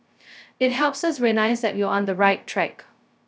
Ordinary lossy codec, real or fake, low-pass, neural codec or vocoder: none; fake; none; codec, 16 kHz, 0.2 kbps, FocalCodec